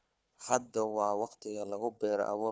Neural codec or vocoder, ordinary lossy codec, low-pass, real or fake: codec, 16 kHz, 4 kbps, FunCodec, trained on Chinese and English, 50 frames a second; none; none; fake